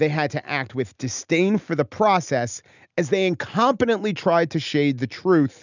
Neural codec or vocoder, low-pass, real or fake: none; 7.2 kHz; real